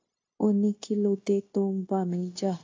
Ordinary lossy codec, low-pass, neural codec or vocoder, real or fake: AAC, 32 kbps; 7.2 kHz; codec, 16 kHz, 0.9 kbps, LongCat-Audio-Codec; fake